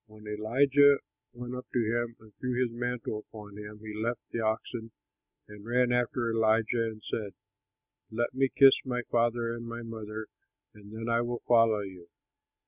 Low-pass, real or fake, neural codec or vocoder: 3.6 kHz; real; none